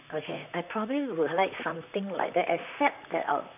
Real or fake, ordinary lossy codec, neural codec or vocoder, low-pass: fake; none; vocoder, 44.1 kHz, 128 mel bands, Pupu-Vocoder; 3.6 kHz